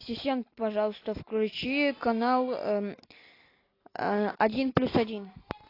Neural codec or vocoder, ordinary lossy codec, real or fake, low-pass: none; AAC, 32 kbps; real; 5.4 kHz